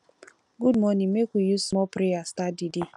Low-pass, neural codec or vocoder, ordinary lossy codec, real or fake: 10.8 kHz; none; none; real